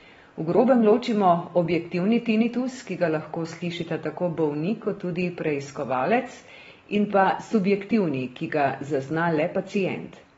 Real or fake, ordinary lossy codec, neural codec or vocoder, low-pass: real; AAC, 24 kbps; none; 19.8 kHz